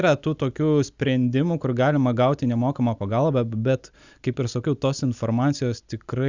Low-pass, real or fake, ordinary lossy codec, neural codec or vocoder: 7.2 kHz; real; Opus, 64 kbps; none